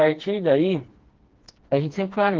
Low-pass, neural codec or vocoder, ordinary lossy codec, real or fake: 7.2 kHz; codec, 16 kHz, 2 kbps, FreqCodec, smaller model; Opus, 16 kbps; fake